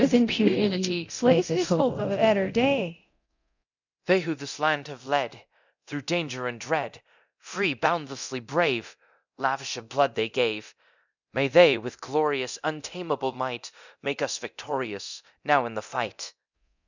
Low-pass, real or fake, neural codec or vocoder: 7.2 kHz; fake; codec, 24 kHz, 0.9 kbps, DualCodec